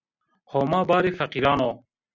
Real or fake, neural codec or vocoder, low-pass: real; none; 7.2 kHz